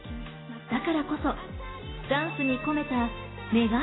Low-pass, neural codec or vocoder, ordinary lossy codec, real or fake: 7.2 kHz; none; AAC, 16 kbps; real